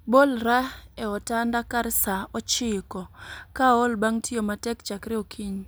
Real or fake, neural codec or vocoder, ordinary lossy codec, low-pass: real; none; none; none